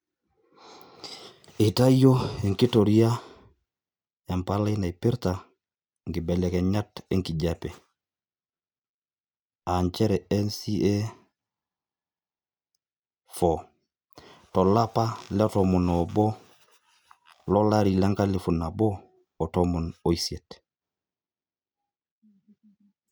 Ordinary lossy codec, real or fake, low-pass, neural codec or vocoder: none; real; none; none